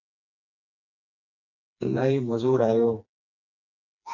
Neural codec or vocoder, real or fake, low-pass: codec, 16 kHz, 2 kbps, FreqCodec, smaller model; fake; 7.2 kHz